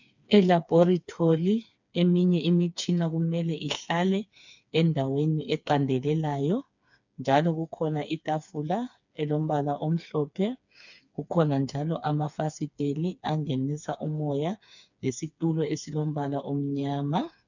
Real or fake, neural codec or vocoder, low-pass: fake; codec, 16 kHz, 4 kbps, FreqCodec, smaller model; 7.2 kHz